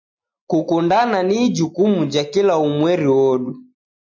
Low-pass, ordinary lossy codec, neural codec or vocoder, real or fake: 7.2 kHz; MP3, 64 kbps; none; real